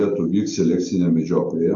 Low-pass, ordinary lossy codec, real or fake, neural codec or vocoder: 7.2 kHz; AAC, 64 kbps; real; none